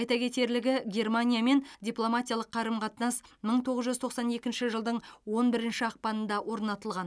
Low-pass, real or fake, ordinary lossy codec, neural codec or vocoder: none; real; none; none